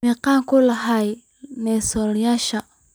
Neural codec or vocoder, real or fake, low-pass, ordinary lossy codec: none; real; none; none